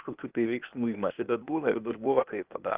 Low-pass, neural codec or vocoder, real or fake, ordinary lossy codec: 3.6 kHz; codec, 16 kHz, 0.8 kbps, ZipCodec; fake; Opus, 32 kbps